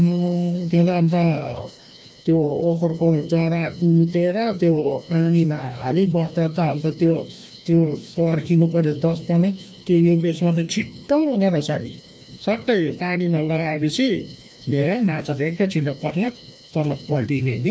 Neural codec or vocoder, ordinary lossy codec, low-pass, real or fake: codec, 16 kHz, 1 kbps, FreqCodec, larger model; none; none; fake